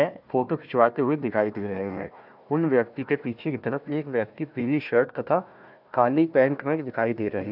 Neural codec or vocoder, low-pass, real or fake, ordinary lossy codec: codec, 16 kHz, 1 kbps, FunCodec, trained on Chinese and English, 50 frames a second; 5.4 kHz; fake; none